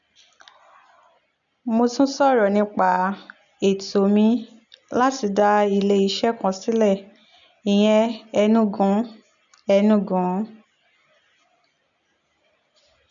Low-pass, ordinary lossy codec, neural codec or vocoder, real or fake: 7.2 kHz; none; none; real